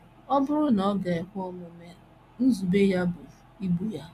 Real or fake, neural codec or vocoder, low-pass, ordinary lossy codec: real; none; 14.4 kHz; MP3, 96 kbps